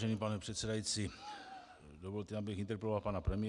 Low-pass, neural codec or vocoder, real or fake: 10.8 kHz; none; real